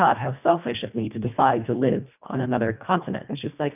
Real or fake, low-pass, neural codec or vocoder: fake; 3.6 kHz; codec, 24 kHz, 1.5 kbps, HILCodec